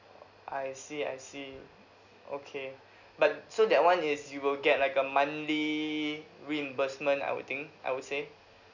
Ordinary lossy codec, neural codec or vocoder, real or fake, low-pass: none; none; real; 7.2 kHz